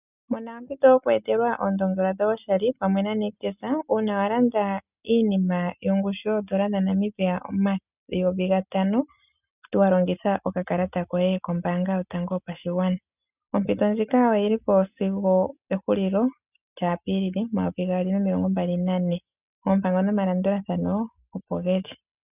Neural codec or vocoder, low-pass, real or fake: none; 3.6 kHz; real